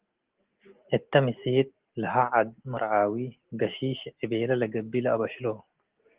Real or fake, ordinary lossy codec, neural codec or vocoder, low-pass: real; Opus, 16 kbps; none; 3.6 kHz